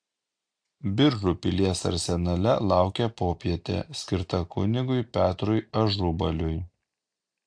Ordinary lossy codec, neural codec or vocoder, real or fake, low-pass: AAC, 64 kbps; none; real; 9.9 kHz